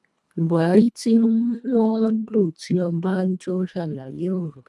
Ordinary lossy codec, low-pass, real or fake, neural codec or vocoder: none; none; fake; codec, 24 kHz, 1.5 kbps, HILCodec